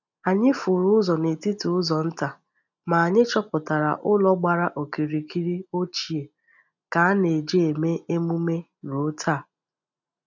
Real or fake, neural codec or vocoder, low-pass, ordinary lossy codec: real; none; 7.2 kHz; none